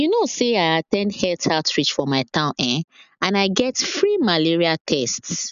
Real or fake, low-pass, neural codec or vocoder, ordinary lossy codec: real; 7.2 kHz; none; none